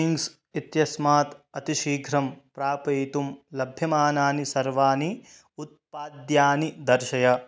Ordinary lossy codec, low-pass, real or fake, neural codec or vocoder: none; none; real; none